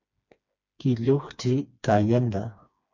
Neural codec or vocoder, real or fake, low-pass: codec, 16 kHz, 2 kbps, FreqCodec, smaller model; fake; 7.2 kHz